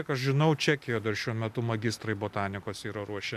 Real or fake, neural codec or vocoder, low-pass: real; none; 14.4 kHz